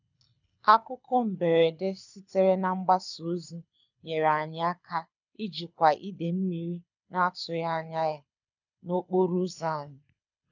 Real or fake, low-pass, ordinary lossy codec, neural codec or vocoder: fake; 7.2 kHz; none; codec, 24 kHz, 6 kbps, HILCodec